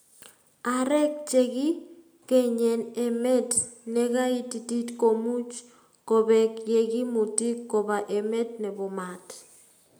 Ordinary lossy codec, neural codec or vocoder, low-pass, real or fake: none; none; none; real